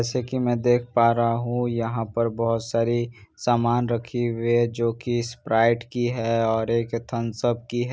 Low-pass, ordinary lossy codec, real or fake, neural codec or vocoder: none; none; real; none